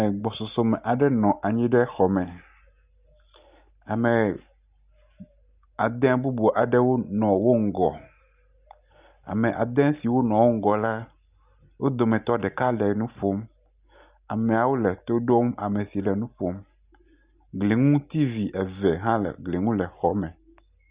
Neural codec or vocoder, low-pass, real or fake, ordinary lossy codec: none; 3.6 kHz; real; Opus, 64 kbps